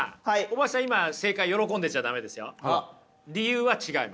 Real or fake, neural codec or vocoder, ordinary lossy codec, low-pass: real; none; none; none